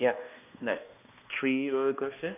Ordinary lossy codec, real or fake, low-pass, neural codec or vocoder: none; fake; 3.6 kHz; codec, 16 kHz, 1 kbps, X-Codec, HuBERT features, trained on balanced general audio